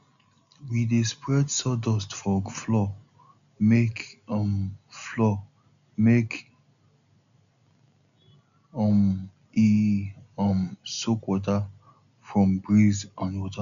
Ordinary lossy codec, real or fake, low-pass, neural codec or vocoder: none; real; 7.2 kHz; none